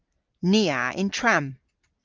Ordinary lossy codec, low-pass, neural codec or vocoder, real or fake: Opus, 32 kbps; 7.2 kHz; none; real